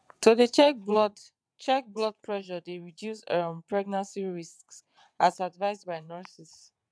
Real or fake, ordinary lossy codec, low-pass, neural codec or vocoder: fake; none; none; vocoder, 22.05 kHz, 80 mel bands, WaveNeXt